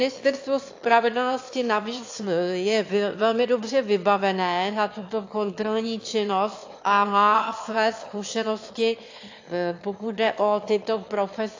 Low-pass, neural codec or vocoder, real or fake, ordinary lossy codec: 7.2 kHz; autoencoder, 22.05 kHz, a latent of 192 numbers a frame, VITS, trained on one speaker; fake; AAC, 48 kbps